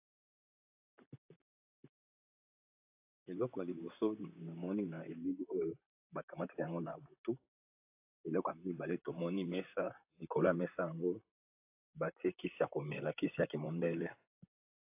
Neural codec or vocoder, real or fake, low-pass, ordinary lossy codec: vocoder, 44.1 kHz, 128 mel bands, Pupu-Vocoder; fake; 3.6 kHz; AAC, 32 kbps